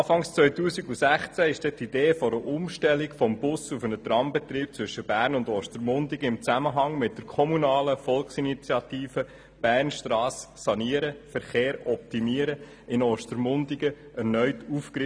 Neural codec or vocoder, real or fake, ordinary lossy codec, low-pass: none; real; none; none